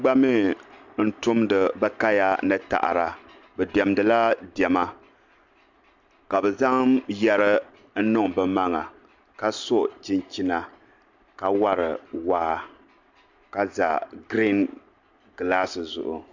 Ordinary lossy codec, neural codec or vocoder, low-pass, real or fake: MP3, 64 kbps; none; 7.2 kHz; real